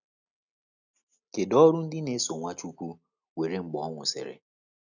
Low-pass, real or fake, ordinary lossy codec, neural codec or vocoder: 7.2 kHz; real; none; none